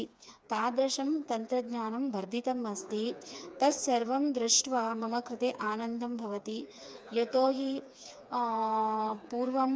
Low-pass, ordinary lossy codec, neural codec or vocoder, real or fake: none; none; codec, 16 kHz, 4 kbps, FreqCodec, smaller model; fake